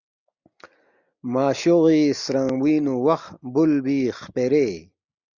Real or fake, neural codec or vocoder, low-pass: real; none; 7.2 kHz